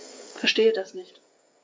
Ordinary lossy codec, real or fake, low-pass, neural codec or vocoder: none; fake; none; codec, 16 kHz, 8 kbps, FreqCodec, smaller model